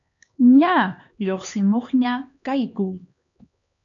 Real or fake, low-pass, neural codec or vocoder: fake; 7.2 kHz; codec, 16 kHz, 2 kbps, X-Codec, HuBERT features, trained on LibriSpeech